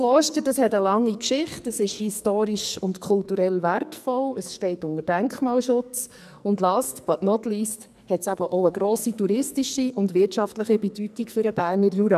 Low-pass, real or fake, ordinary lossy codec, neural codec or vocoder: 14.4 kHz; fake; none; codec, 44.1 kHz, 2.6 kbps, SNAC